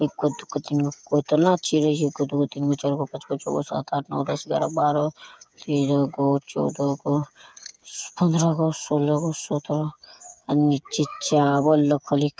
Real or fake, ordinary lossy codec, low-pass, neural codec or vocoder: fake; none; none; codec, 16 kHz, 8 kbps, FreqCodec, smaller model